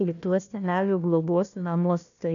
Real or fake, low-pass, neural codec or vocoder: fake; 7.2 kHz; codec, 16 kHz, 1 kbps, FunCodec, trained on Chinese and English, 50 frames a second